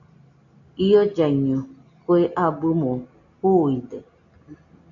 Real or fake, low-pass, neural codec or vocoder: real; 7.2 kHz; none